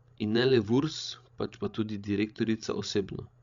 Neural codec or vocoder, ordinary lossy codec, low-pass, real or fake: codec, 16 kHz, 16 kbps, FreqCodec, larger model; Opus, 64 kbps; 7.2 kHz; fake